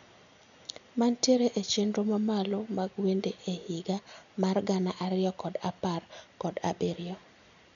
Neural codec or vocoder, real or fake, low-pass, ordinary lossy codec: none; real; 7.2 kHz; none